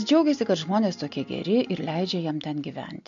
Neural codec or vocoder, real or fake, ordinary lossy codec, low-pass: none; real; MP3, 64 kbps; 7.2 kHz